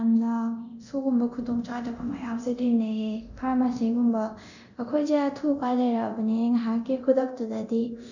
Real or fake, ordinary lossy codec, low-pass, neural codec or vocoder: fake; none; 7.2 kHz; codec, 24 kHz, 0.9 kbps, DualCodec